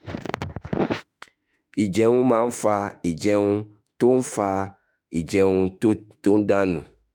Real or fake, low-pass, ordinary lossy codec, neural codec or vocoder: fake; 19.8 kHz; none; autoencoder, 48 kHz, 32 numbers a frame, DAC-VAE, trained on Japanese speech